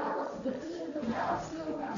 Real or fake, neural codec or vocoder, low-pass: fake; codec, 16 kHz, 1.1 kbps, Voila-Tokenizer; 7.2 kHz